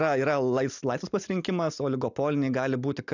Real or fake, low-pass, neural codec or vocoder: real; 7.2 kHz; none